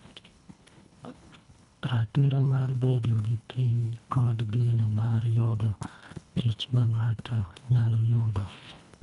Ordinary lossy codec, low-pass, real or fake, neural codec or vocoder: none; 10.8 kHz; fake; codec, 24 kHz, 1.5 kbps, HILCodec